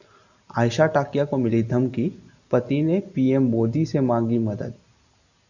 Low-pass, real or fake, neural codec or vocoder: 7.2 kHz; real; none